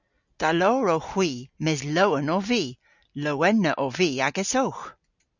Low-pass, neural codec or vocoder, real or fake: 7.2 kHz; none; real